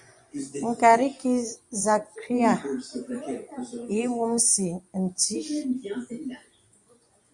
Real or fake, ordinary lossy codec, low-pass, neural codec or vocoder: fake; Opus, 64 kbps; 10.8 kHz; vocoder, 24 kHz, 100 mel bands, Vocos